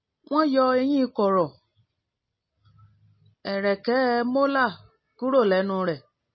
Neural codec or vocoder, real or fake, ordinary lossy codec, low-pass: none; real; MP3, 24 kbps; 7.2 kHz